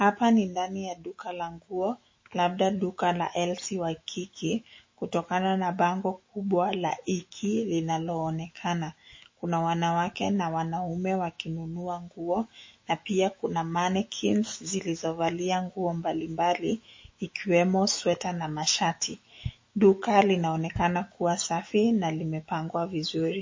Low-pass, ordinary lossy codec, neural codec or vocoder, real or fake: 7.2 kHz; MP3, 32 kbps; autoencoder, 48 kHz, 128 numbers a frame, DAC-VAE, trained on Japanese speech; fake